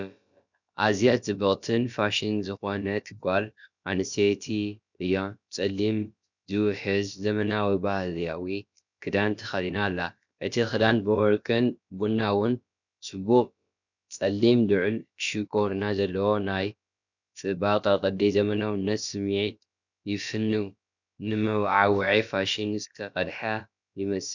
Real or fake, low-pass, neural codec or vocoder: fake; 7.2 kHz; codec, 16 kHz, about 1 kbps, DyCAST, with the encoder's durations